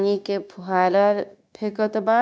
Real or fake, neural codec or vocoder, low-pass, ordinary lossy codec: fake; codec, 16 kHz, 0.9 kbps, LongCat-Audio-Codec; none; none